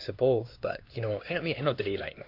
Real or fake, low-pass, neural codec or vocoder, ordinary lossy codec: fake; 5.4 kHz; codec, 16 kHz, 2 kbps, X-Codec, HuBERT features, trained on LibriSpeech; AAC, 32 kbps